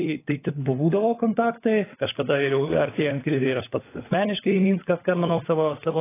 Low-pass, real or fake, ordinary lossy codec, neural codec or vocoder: 3.6 kHz; fake; AAC, 16 kbps; codec, 16 kHz, 16 kbps, FunCodec, trained on LibriTTS, 50 frames a second